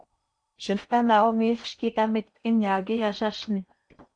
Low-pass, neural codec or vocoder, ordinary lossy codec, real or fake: 9.9 kHz; codec, 16 kHz in and 24 kHz out, 0.8 kbps, FocalCodec, streaming, 65536 codes; MP3, 96 kbps; fake